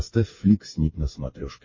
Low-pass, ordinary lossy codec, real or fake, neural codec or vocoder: 7.2 kHz; MP3, 32 kbps; fake; codec, 16 kHz, 8 kbps, FreqCodec, smaller model